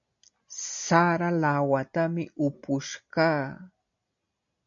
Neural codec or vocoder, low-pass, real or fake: none; 7.2 kHz; real